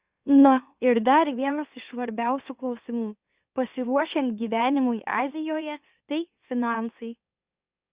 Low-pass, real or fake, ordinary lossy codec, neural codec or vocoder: 3.6 kHz; fake; Opus, 64 kbps; autoencoder, 44.1 kHz, a latent of 192 numbers a frame, MeloTTS